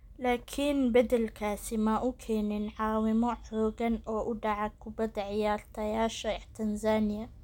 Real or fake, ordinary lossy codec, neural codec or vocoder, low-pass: real; none; none; 19.8 kHz